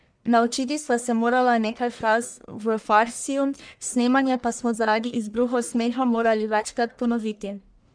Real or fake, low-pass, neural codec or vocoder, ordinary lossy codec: fake; 9.9 kHz; codec, 44.1 kHz, 1.7 kbps, Pupu-Codec; none